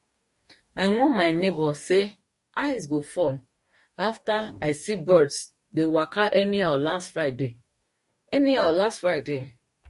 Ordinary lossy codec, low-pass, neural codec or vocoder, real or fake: MP3, 48 kbps; 14.4 kHz; codec, 44.1 kHz, 2.6 kbps, DAC; fake